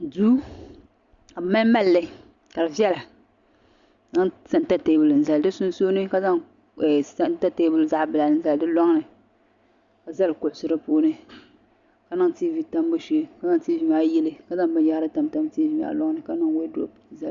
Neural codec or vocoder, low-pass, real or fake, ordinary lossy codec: none; 7.2 kHz; real; Opus, 64 kbps